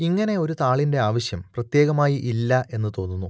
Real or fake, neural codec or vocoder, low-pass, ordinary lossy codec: real; none; none; none